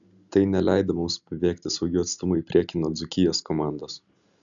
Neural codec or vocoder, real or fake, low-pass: none; real; 7.2 kHz